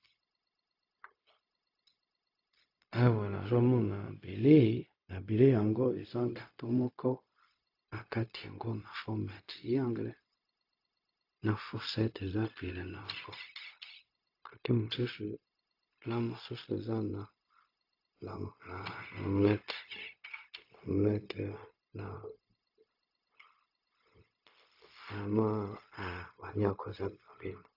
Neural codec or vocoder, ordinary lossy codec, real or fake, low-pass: codec, 16 kHz, 0.4 kbps, LongCat-Audio-Codec; AAC, 32 kbps; fake; 5.4 kHz